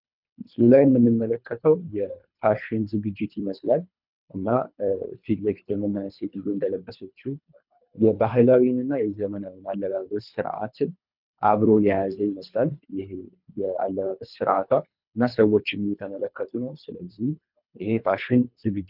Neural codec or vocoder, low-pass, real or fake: codec, 24 kHz, 3 kbps, HILCodec; 5.4 kHz; fake